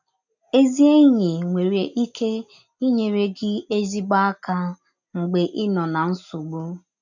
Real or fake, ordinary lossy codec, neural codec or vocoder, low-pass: real; none; none; 7.2 kHz